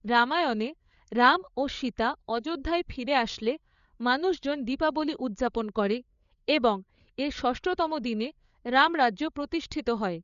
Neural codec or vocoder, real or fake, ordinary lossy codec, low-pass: codec, 16 kHz, 8 kbps, FreqCodec, larger model; fake; none; 7.2 kHz